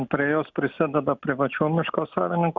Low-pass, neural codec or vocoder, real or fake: 7.2 kHz; none; real